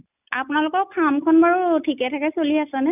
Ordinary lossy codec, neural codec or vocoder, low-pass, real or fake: none; none; 3.6 kHz; real